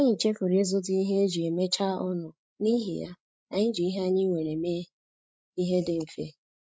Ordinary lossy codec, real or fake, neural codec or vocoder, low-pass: none; fake; codec, 16 kHz, 16 kbps, FreqCodec, larger model; none